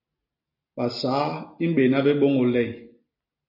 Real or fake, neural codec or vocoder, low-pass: real; none; 5.4 kHz